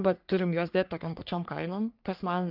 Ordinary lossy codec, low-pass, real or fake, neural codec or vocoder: Opus, 32 kbps; 5.4 kHz; fake; codec, 44.1 kHz, 3.4 kbps, Pupu-Codec